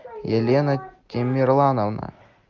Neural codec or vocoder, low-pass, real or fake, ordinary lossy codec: none; 7.2 kHz; real; Opus, 32 kbps